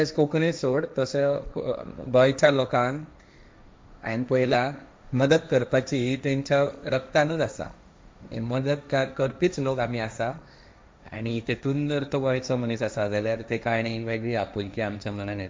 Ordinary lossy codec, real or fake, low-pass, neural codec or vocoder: none; fake; none; codec, 16 kHz, 1.1 kbps, Voila-Tokenizer